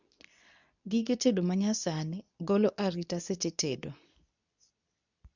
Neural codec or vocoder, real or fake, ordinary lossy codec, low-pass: codec, 24 kHz, 0.9 kbps, WavTokenizer, medium speech release version 2; fake; none; 7.2 kHz